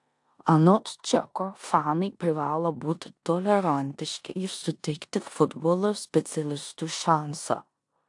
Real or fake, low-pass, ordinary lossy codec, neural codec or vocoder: fake; 10.8 kHz; AAC, 64 kbps; codec, 16 kHz in and 24 kHz out, 0.9 kbps, LongCat-Audio-Codec, four codebook decoder